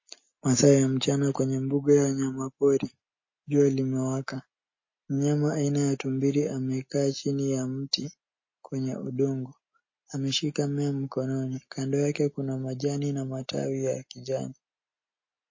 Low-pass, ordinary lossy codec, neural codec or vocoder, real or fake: 7.2 kHz; MP3, 32 kbps; none; real